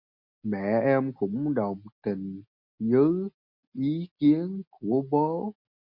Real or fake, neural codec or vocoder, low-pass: real; none; 5.4 kHz